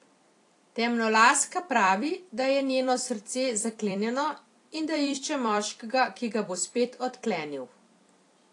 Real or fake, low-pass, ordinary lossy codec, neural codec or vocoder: fake; 10.8 kHz; AAC, 48 kbps; vocoder, 44.1 kHz, 128 mel bands every 256 samples, BigVGAN v2